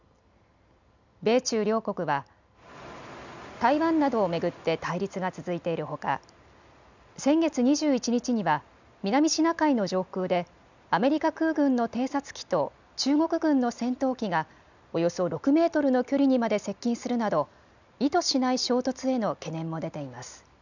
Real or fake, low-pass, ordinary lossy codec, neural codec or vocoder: real; 7.2 kHz; none; none